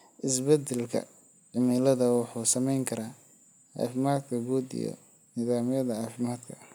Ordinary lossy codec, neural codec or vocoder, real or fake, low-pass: none; none; real; none